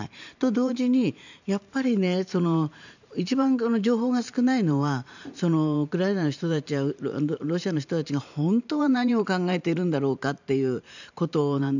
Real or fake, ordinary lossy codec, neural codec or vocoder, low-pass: fake; none; vocoder, 44.1 kHz, 128 mel bands every 512 samples, BigVGAN v2; 7.2 kHz